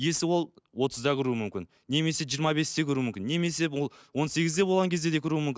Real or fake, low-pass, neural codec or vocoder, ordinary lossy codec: real; none; none; none